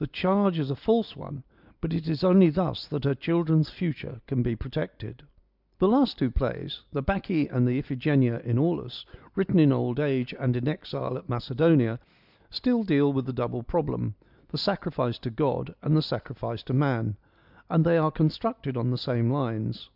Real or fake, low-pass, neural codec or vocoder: real; 5.4 kHz; none